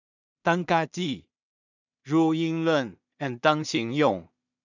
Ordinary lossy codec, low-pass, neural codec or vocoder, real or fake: none; 7.2 kHz; codec, 16 kHz in and 24 kHz out, 0.4 kbps, LongCat-Audio-Codec, two codebook decoder; fake